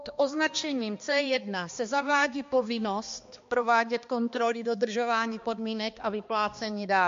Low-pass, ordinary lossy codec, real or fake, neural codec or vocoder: 7.2 kHz; MP3, 48 kbps; fake; codec, 16 kHz, 2 kbps, X-Codec, HuBERT features, trained on balanced general audio